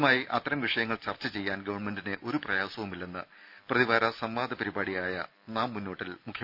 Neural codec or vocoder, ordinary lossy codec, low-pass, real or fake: none; none; 5.4 kHz; real